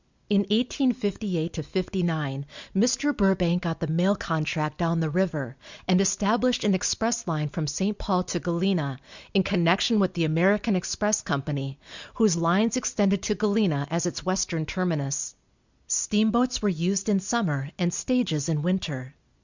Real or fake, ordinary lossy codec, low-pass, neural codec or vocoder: real; Opus, 64 kbps; 7.2 kHz; none